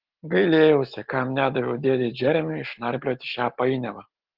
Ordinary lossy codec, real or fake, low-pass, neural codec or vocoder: Opus, 16 kbps; real; 5.4 kHz; none